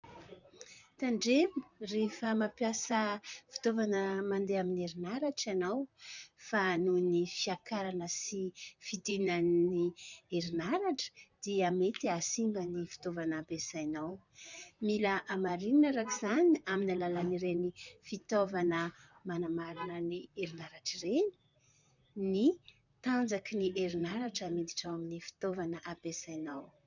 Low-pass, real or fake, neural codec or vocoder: 7.2 kHz; fake; vocoder, 44.1 kHz, 128 mel bands, Pupu-Vocoder